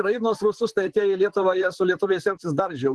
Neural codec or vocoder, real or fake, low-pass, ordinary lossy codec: vocoder, 44.1 kHz, 128 mel bands, Pupu-Vocoder; fake; 10.8 kHz; Opus, 16 kbps